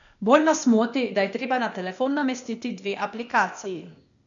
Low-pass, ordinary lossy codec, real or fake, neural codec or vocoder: 7.2 kHz; none; fake; codec, 16 kHz, 0.8 kbps, ZipCodec